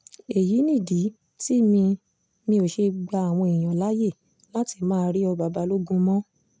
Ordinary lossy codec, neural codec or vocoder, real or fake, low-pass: none; none; real; none